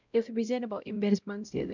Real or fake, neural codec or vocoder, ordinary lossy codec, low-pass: fake; codec, 16 kHz, 0.5 kbps, X-Codec, WavLM features, trained on Multilingual LibriSpeech; none; 7.2 kHz